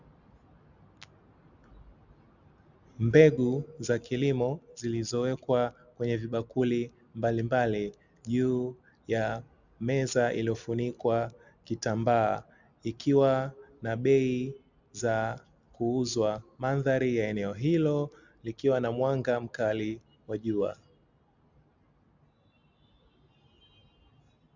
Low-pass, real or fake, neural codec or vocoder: 7.2 kHz; real; none